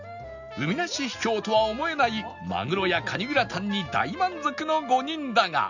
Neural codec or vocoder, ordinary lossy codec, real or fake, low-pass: none; none; real; 7.2 kHz